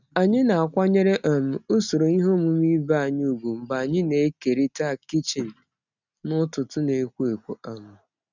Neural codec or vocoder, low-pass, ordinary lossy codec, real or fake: none; 7.2 kHz; none; real